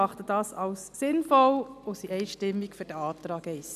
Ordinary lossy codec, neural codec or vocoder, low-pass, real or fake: none; none; 14.4 kHz; real